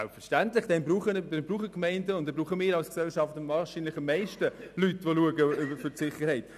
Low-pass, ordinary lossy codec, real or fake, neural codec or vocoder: 14.4 kHz; none; real; none